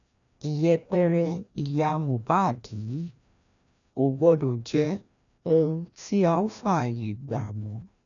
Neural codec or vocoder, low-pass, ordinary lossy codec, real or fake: codec, 16 kHz, 1 kbps, FreqCodec, larger model; 7.2 kHz; none; fake